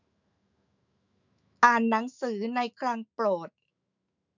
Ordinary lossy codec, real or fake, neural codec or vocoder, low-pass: none; fake; autoencoder, 48 kHz, 128 numbers a frame, DAC-VAE, trained on Japanese speech; 7.2 kHz